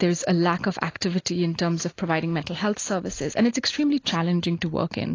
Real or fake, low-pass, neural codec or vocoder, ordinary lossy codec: real; 7.2 kHz; none; AAC, 32 kbps